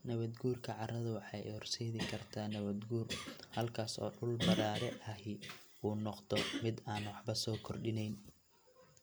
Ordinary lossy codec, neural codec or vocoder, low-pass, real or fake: none; none; none; real